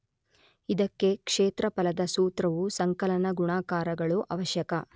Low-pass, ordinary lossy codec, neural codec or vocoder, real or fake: none; none; none; real